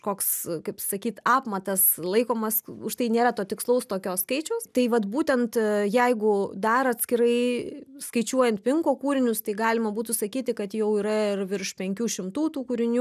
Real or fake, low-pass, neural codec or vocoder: real; 14.4 kHz; none